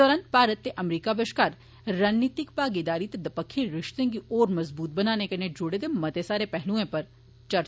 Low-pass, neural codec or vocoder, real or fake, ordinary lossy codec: none; none; real; none